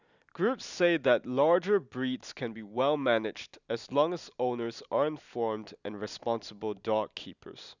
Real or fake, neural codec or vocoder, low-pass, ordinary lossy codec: real; none; 7.2 kHz; none